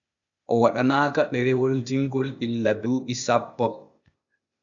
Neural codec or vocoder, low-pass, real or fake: codec, 16 kHz, 0.8 kbps, ZipCodec; 7.2 kHz; fake